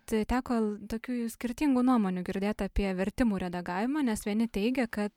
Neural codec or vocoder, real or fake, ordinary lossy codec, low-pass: none; real; MP3, 96 kbps; 19.8 kHz